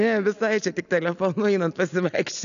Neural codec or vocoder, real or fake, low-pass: none; real; 7.2 kHz